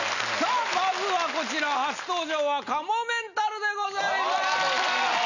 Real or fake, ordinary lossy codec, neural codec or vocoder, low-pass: real; none; none; 7.2 kHz